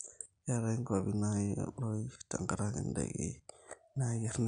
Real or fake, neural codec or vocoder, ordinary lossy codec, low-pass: real; none; none; 9.9 kHz